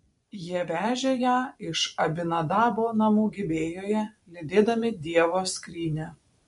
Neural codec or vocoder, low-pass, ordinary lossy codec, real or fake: vocoder, 24 kHz, 100 mel bands, Vocos; 10.8 kHz; MP3, 64 kbps; fake